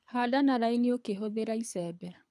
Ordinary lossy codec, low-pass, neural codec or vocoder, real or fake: none; none; codec, 24 kHz, 6 kbps, HILCodec; fake